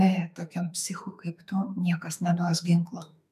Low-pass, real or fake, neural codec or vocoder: 14.4 kHz; fake; autoencoder, 48 kHz, 32 numbers a frame, DAC-VAE, trained on Japanese speech